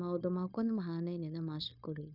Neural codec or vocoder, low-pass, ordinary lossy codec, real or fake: codec, 16 kHz, 0.9 kbps, LongCat-Audio-Codec; 5.4 kHz; none; fake